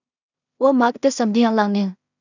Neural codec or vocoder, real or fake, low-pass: codec, 16 kHz in and 24 kHz out, 0.4 kbps, LongCat-Audio-Codec, two codebook decoder; fake; 7.2 kHz